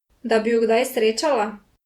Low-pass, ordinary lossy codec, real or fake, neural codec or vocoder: 19.8 kHz; Opus, 64 kbps; fake; vocoder, 48 kHz, 128 mel bands, Vocos